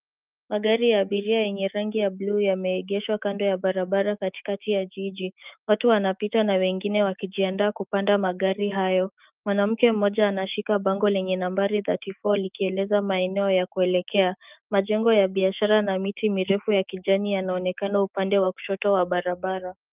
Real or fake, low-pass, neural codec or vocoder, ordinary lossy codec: fake; 3.6 kHz; autoencoder, 48 kHz, 128 numbers a frame, DAC-VAE, trained on Japanese speech; Opus, 24 kbps